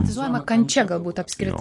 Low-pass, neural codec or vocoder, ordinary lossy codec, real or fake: 10.8 kHz; none; MP3, 48 kbps; real